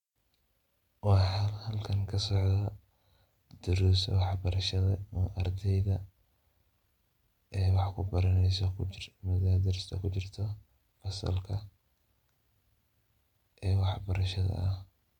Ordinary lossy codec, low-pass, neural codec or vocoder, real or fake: MP3, 96 kbps; 19.8 kHz; none; real